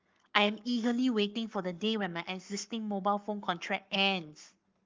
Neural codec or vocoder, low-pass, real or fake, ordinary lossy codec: codec, 44.1 kHz, 7.8 kbps, Pupu-Codec; 7.2 kHz; fake; Opus, 32 kbps